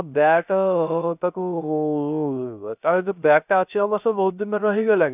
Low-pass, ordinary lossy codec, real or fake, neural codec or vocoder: 3.6 kHz; none; fake; codec, 16 kHz, 0.3 kbps, FocalCodec